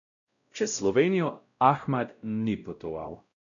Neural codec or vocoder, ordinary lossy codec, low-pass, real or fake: codec, 16 kHz, 0.5 kbps, X-Codec, WavLM features, trained on Multilingual LibriSpeech; none; 7.2 kHz; fake